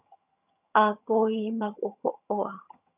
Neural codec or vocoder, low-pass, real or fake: vocoder, 22.05 kHz, 80 mel bands, HiFi-GAN; 3.6 kHz; fake